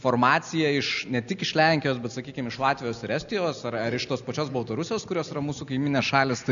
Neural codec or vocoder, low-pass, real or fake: none; 7.2 kHz; real